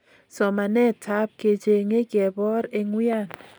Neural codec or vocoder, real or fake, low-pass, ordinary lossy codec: none; real; none; none